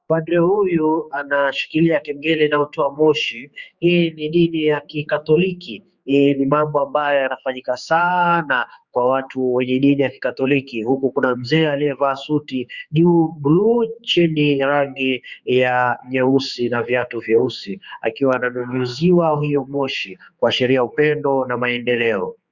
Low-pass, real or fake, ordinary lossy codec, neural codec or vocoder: 7.2 kHz; fake; Opus, 64 kbps; codec, 16 kHz, 4 kbps, X-Codec, HuBERT features, trained on general audio